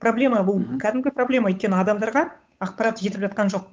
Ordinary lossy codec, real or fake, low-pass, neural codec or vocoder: none; fake; none; codec, 16 kHz, 8 kbps, FunCodec, trained on Chinese and English, 25 frames a second